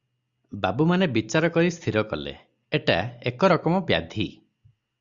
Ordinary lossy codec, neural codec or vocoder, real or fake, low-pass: Opus, 64 kbps; none; real; 7.2 kHz